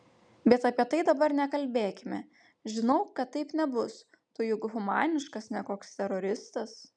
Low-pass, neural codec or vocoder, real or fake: 9.9 kHz; none; real